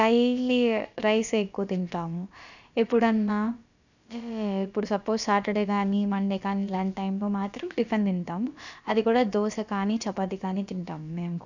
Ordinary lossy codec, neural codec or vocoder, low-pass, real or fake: none; codec, 16 kHz, about 1 kbps, DyCAST, with the encoder's durations; 7.2 kHz; fake